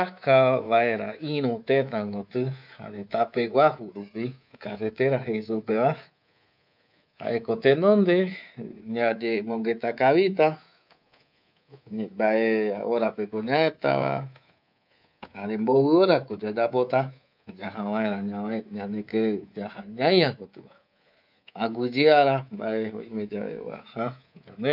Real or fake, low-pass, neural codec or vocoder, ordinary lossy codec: fake; 5.4 kHz; codec, 24 kHz, 3.1 kbps, DualCodec; none